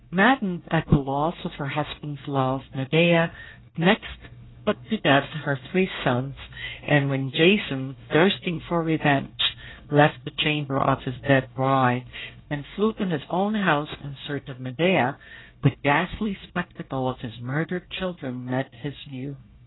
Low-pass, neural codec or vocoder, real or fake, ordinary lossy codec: 7.2 kHz; codec, 24 kHz, 1 kbps, SNAC; fake; AAC, 16 kbps